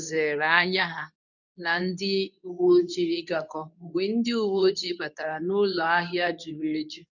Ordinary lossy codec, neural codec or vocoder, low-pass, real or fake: none; codec, 24 kHz, 0.9 kbps, WavTokenizer, medium speech release version 2; 7.2 kHz; fake